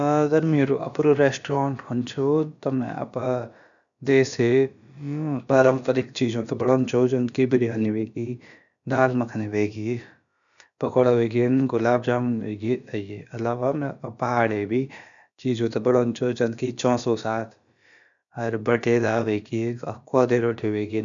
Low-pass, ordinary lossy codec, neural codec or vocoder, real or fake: 7.2 kHz; none; codec, 16 kHz, about 1 kbps, DyCAST, with the encoder's durations; fake